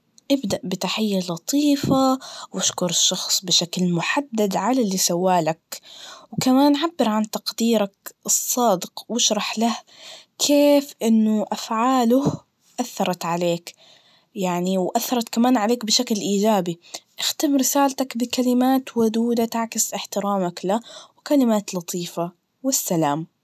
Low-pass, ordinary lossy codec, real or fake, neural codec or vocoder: 14.4 kHz; none; real; none